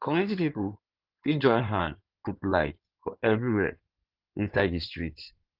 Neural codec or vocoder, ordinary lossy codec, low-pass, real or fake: codec, 16 kHz in and 24 kHz out, 2.2 kbps, FireRedTTS-2 codec; Opus, 32 kbps; 5.4 kHz; fake